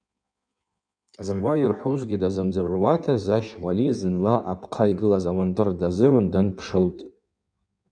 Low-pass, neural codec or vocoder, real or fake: 9.9 kHz; codec, 16 kHz in and 24 kHz out, 1.1 kbps, FireRedTTS-2 codec; fake